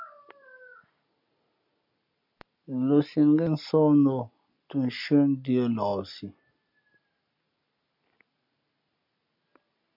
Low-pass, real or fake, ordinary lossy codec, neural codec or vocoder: 5.4 kHz; real; MP3, 48 kbps; none